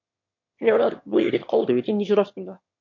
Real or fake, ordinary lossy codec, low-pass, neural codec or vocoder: fake; MP3, 48 kbps; 7.2 kHz; autoencoder, 22.05 kHz, a latent of 192 numbers a frame, VITS, trained on one speaker